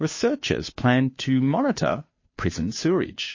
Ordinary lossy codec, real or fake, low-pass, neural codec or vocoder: MP3, 32 kbps; fake; 7.2 kHz; codec, 16 kHz, 2 kbps, FunCodec, trained on Chinese and English, 25 frames a second